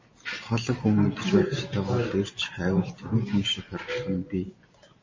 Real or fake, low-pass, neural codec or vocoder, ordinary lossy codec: real; 7.2 kHz; none; MP3, 32 kbps